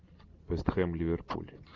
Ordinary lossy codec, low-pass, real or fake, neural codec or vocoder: Opus, 32 kbps; 7.2 kHz; real; none